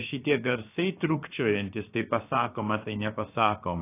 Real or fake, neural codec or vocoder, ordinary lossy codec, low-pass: fake; codec, 16 kHz, about 1 kbps, DyCAST, with the encoder's durations; AAC, 24 kbps; 3.6 kHz